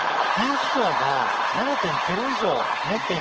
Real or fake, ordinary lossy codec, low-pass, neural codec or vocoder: fake; Opus, 16 kbps; 7.2 kHz; codec, 16 kHz, 4.8 kbps, FACodec